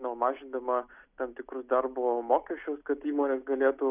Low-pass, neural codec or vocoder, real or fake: 3.6 kHz; none; real